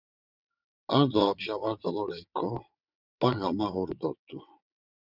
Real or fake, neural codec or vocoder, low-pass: fake; vocoder, 22.05 kHz, 80 mel bands, WaveNeXt; 5.4 kHz